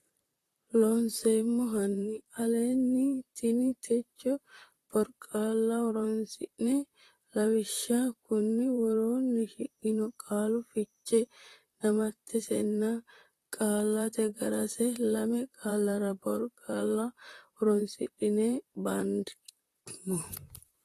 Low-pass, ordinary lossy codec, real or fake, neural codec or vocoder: 14.4 kHz; AAC, 48 kbps; fake; vocoder, 44.1 kHz, 128 mel bands, Pupu-Vocoder